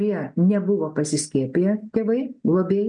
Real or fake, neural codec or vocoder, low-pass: real; none; 9.9 kHz